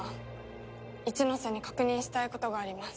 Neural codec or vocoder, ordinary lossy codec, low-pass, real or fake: none; none; none; real